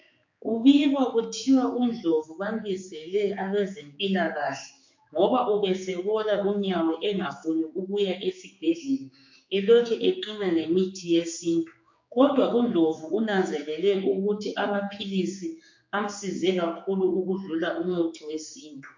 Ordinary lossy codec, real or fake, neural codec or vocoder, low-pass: MP3, 48 kbps; fake; codec, 16 kHz, 4 kbps, X-Codec, HuBERT features, trained on general audio; 7.2 kHz